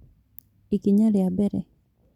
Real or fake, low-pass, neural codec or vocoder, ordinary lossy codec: real; 19.8 kHz; none; none